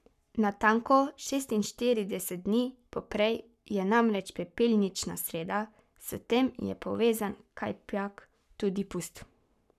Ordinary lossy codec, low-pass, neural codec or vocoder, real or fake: none; 14.4 kHz; codec, 44.1 kHz, 7.8 kbps, Pupu-Codec; fake